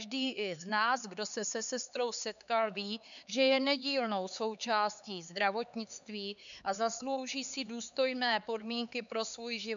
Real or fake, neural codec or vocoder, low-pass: fake; codec, 16 kHz, 4 kbps, X-Codec, HuBERT features, trained on LibriSpeech; 7.2 kHz